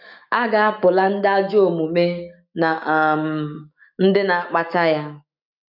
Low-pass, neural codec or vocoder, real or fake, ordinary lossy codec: 5.4 kHz; autoencoder, 48 kHz, 128 numbers a frame, DAC-VAE, trained on Japanese speech; fake; none